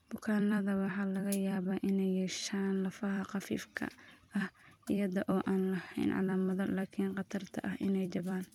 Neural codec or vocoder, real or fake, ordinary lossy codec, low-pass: vocoder, 44.1 kHz, 128 mel bands every 256 samples, BigVGAN v2; fake; MP3, 96 kbps; 19.8 kHz